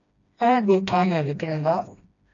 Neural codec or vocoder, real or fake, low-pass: codec, 16 kHz, 1 kbps, FreqCodec, smaller model; fake; 7.2 kHz